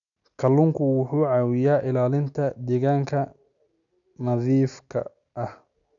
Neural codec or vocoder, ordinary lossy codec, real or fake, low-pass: none; none; real; 7.2 kHz